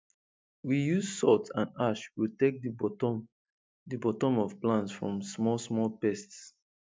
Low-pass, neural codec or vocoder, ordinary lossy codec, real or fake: none; none; none; real